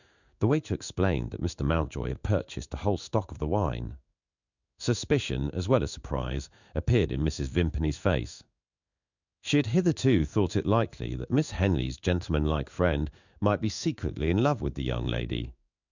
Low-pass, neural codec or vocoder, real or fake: 7.2 kHz; codec, 16 kHz in and 24 kHz out, 1 kbps, XY-Tokenizer; fake